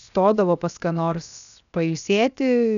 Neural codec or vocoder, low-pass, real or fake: codec, 16 kHz, 0.7 kbps, FocalCodec; 7.2 kHz; fake